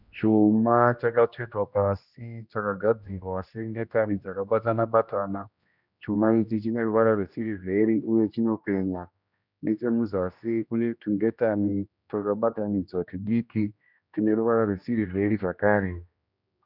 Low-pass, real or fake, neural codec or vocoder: 5.4 kHz; fake; codec, 16 kHz, 1 kbps, X-Codec, HuBERT features, trained on general audio